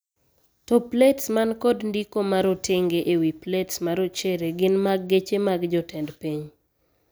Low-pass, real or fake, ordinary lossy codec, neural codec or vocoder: none; real; none; none